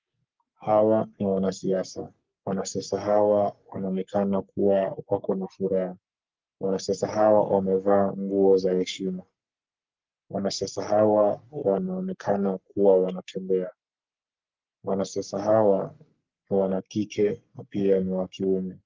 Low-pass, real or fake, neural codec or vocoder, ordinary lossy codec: 7.2 kHz; fake; codec, 44.1 kHz, 3.4 kbps, Pupu-Codec; Opus, 32 kbps